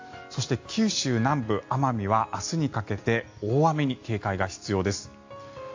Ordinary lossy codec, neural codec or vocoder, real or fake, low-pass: AAC, 48 kbps; none; real; 7.2 kHz